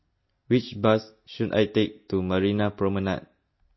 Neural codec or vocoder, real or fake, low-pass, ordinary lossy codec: none; real; 7.2 kHz; MP3, 24 kbps